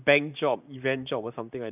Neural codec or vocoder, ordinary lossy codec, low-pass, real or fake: vocoder, 44.1 kHz, 128 mel bands, Pupu-Vocoder; none; 3.6 kHz; fake